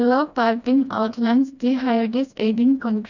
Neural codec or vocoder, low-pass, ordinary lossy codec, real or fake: codec, 16 kHz, 1 kbps, FreqCodec, smaller model; 7.2 kHz; none; fake